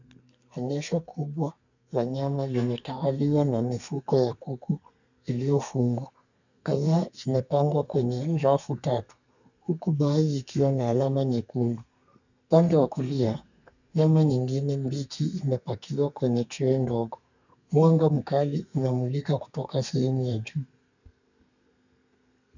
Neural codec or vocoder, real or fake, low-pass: codec, 32 kHz, 1.9 kbps, SNAC; fake; 7.2 kHz